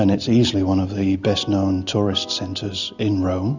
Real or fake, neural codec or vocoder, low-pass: real; none; 7.2 kHz